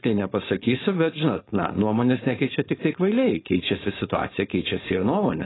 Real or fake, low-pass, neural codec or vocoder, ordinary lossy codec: fake; 7.2 kHz; codec, 16 kHz, 4.8 kbps, FACodec; AAC, 16 kbps